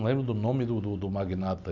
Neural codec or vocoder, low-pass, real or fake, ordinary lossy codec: none; 7.2 kHz; real; none